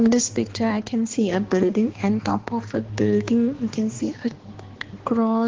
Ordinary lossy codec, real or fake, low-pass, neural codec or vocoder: Opus, 16 kbps; fake; 7.2 kHz; codec, 16 kHz, 2 kbps, X-Codec, HuBERT features, trained on balanced general audio